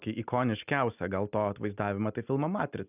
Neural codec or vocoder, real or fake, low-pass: none; real; 3.6 kHz